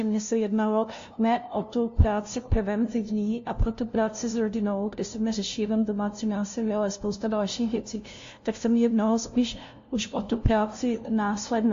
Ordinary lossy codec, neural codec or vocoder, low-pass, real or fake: AAC, 48 kbps; codec, 16 kHz, 0.5 kbps, FunCodec, trained on LibriTTS, 25 frames a second; 7.2 kHz; fake